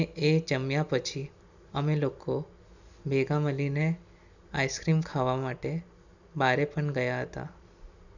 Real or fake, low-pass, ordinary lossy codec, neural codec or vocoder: real; 7.2 kHz; none; none